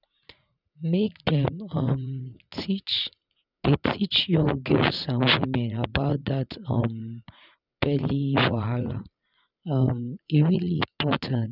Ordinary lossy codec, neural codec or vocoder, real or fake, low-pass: none; vocoder, 44.1 kHz, 80 mel bands, Vocos; fake; 5.4 kHz